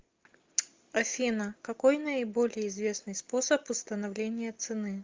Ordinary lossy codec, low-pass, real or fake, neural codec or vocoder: Opus, 32 kbps; 7.2 kHz; real; none